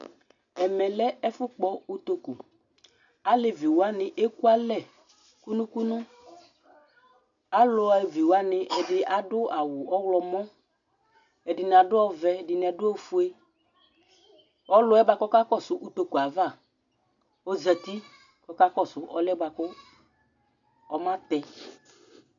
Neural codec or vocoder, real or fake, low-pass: none; real; 7.2 kHz